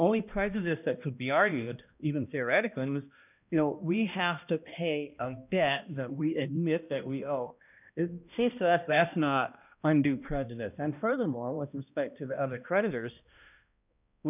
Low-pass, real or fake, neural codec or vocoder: 3.6 kHz; fake; codec, 16 kHz, 1 kbps, X-Codec, HuBERT features, trained on balanced general audio